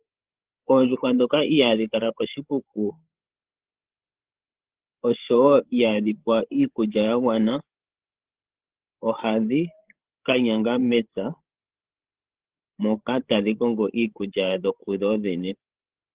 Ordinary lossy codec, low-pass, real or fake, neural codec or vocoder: Opus, 16 kbps; 3.6 kHz; fake; codec, 16 kHz, 16 kbps, FreqCodec, larger model